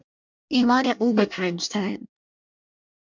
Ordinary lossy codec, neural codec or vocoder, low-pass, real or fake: MP3, 64 kbps; codec, 24 kHz, 1 kbps, SNAC; 7.2 kHz; fake